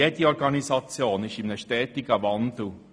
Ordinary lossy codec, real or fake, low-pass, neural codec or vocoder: none; real; none; none